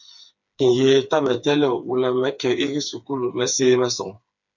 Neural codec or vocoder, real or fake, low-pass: codec, 16 kHz, 4 kbps, FreqCodec, smaller model; fake; 7.2 kHz